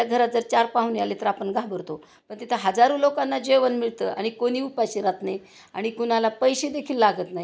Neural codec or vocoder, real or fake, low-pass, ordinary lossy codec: none; real; none; none